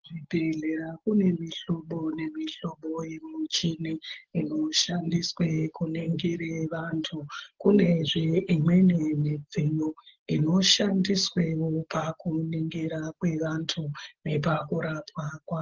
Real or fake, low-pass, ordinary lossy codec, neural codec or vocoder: real; 7.2 kHz; Opus, 16 kbps; none